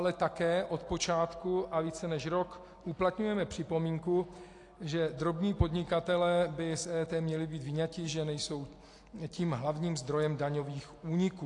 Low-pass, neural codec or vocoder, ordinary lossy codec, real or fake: 10.8 kHz; none; AAC, 48 kbps; real